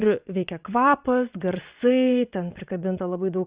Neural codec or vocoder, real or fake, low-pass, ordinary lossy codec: vocoder, 22.05 kHz, 80 mel bands, WaveNeXt; fake; 3.6 kHz; Opus, 64 kbps